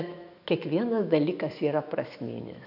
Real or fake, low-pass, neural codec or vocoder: fake; 5.4 kHz; autoencoder, 48 kHz, 128 numbers a frame, DAC-VAE, trained on Japanese speech